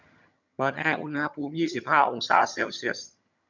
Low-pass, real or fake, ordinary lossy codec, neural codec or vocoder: 7.2 kHz; fake; none; vocoder, 22.05 kHz, 80 mel bands, HiFi-GAN